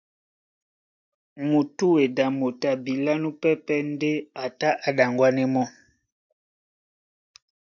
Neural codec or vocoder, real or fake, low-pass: none; real; 7.2 kHz